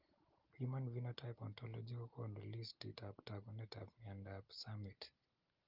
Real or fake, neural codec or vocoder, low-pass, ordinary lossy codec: real; none; 5.4 kHz; Opus, 32 kbps